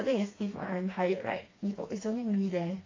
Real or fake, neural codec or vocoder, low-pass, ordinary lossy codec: fake; codec, 16 kHz, 2 kbps, FreqCodec, smaller model; 7.2 kHz; AAC, 32 kbps